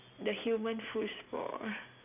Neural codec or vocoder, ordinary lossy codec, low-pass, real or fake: none; none; 3.6 kHz; real